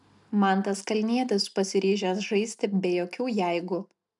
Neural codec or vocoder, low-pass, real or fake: none; 10.8 kHz; real